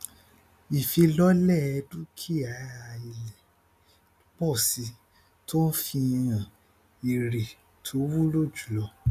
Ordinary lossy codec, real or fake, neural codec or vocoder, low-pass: none; real; none; 14.4 kHz